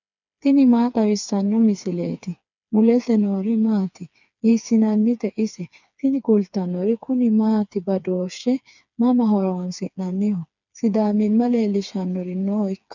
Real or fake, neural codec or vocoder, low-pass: fake; codec, 16 kHz, 4 kbps, FreqCodec, smaller model; 7.2 kHz